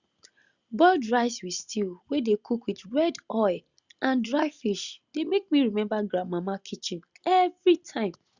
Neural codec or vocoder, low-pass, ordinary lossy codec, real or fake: none; 7.2 kHz; Opus, 64 kbps; real